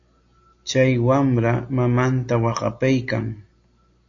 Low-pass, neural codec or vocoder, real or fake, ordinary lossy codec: 7.2 kHz; none; real; AAC, 48 kbps